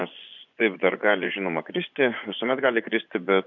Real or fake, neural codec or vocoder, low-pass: fake; vocoder, 24 kHz, 100 mel bands, Vocos; 7.2 kHz